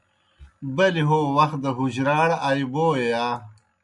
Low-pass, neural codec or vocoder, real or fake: 10.8 kHz; none; real